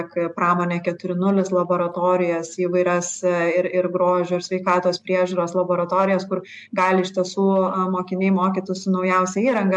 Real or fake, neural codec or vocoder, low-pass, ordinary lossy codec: real; none; 10.8 kHz; MP3, 64 kbps